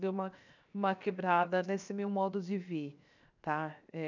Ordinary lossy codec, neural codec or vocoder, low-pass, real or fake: none; codec, 16 kHz, 0.7 kbps, FocalCodec; 7.2 kHz; fake